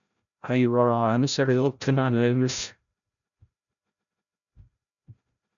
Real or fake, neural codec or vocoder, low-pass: fake; codec, 16 kHz, 0.5 kbps, FreqCodec, larger model; 7.2 kHz